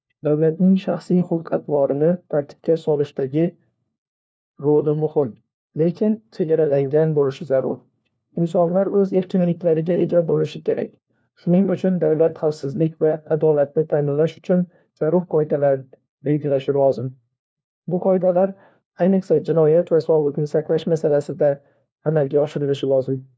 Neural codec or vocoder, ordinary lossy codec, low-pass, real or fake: codec, 16 kHz, 1 kbps, FunCodec, trained on LibriTTS, 50 frames a second; none; none; fake